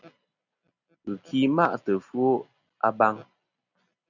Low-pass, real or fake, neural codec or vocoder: 7.2 kHz; real; none